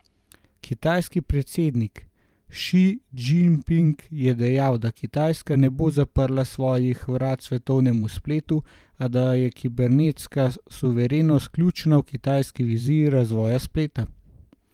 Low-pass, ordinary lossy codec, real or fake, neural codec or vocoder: 19.8 kHz; Opus, 32 kbps; fake; vocoder, 44.1 kHz, 128 mel bands every 512 samples, BigVGAN v2